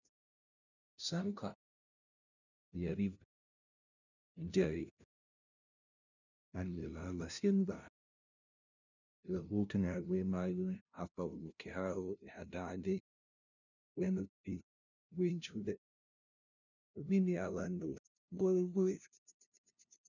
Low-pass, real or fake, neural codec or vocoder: 7.2 kHz; fake; codec, 16 kHz, 0.5 kbps, FunCodec, trained on LibriTTS, 25 frames a second